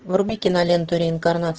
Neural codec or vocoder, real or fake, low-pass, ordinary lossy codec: none; real; 7.2 kHz; Opus, 16 kbps